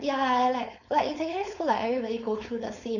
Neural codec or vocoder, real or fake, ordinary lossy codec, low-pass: codec, 16 kHz, 4.8 kbps, FACodec; fake; none; 7.2 kHz